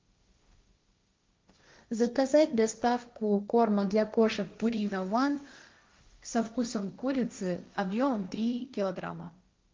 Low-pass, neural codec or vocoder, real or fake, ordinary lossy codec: 7.2 kHz; codec, 16 kHz, 1.1 kbps, Voila-Tokenizer; fake; Opus, 24 kbps